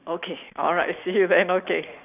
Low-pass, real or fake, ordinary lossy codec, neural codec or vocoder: 3.6 kHz; real; none; none